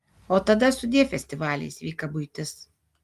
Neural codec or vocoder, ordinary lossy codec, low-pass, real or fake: none; Opus, 32 kbps; 14.4 kHz; real